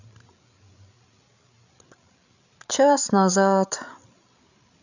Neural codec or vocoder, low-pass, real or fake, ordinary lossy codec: codec, 16 kHz, 8 kbps, FreqCodec, larger model; 7.2 kHz; fake; none